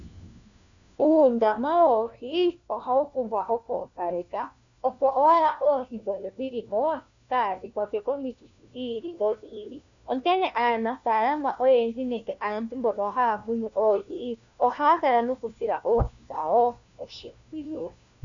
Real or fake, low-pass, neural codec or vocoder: fake; 7.2 kHz; codec, 16 kHz, 1 kbps, FunCodec, trained on LibriTTS, 50 frames a second